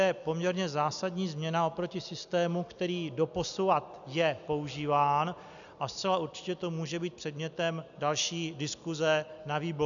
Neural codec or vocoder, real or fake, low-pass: none; real; 7.2 kHz